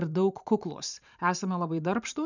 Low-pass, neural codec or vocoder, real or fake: 7.2 kHz; vocoder, 44.1 kHz, 128 mel bands every 512 samples, BigVGAN v2; fake